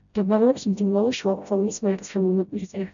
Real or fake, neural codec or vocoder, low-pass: fake; codec, 16 kHz, 0.5 kbps, FreqCodec, smaller model; 7.2 kHz